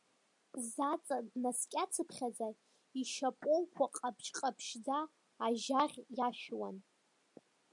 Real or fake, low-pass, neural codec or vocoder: real; 10.8 kHz; none